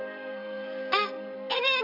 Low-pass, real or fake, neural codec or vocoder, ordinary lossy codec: 5.4 kHz; fake; codec, 44.1 kHz, 2.6 kbps, SNAC; MP3, 48 kbps